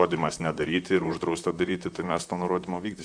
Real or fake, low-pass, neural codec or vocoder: fake; 9.9 kHz; vocoder, 44.1 kHz, 128 mel bands, Pupu-Vocoder